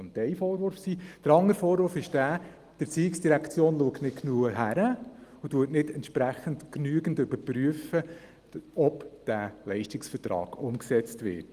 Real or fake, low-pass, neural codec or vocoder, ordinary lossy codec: real; 14.4 kHz; none; Opus, 24 kbps